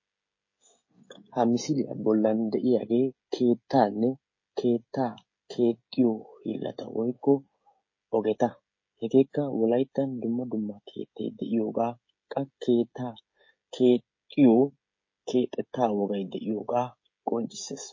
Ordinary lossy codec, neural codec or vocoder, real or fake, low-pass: MP3, 32 kbps; codec, 16 kHz, 16 kbps, FreqCodec, smaller model; fake; 7.2 kHz